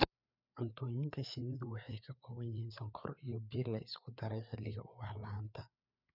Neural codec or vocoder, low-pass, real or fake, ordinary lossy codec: codec, 16 kHz, 4 kbps, FreqCodec, larger model; 5.4 kHz; fake; none